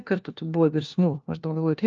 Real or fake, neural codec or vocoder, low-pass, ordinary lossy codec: fake; codec, 16 kHz, 1 kbps, FunCodec, trained on LibriTTS, 50 frames a second; 7.2 kHz; Opus, 24 kbps